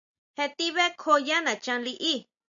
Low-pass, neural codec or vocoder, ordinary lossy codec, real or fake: 7.2 kHz; none; MP3, 96 kbps; real